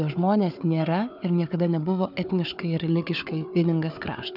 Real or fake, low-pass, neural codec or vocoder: fake; 5.4 kHz; codec, 16 kHz, 4 kbps, FunCodec, trained on Chinese and English, 50 frames a second